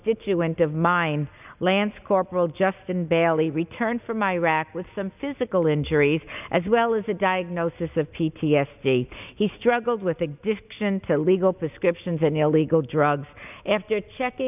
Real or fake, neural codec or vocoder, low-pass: real; none; 3.6 kHz